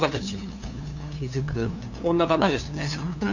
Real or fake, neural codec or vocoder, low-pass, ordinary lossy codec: fake; codec, 16 kHz, 2 kbps, FunCodec, trained on LibriTTS, 25 frames a second; 7.2 kHz; none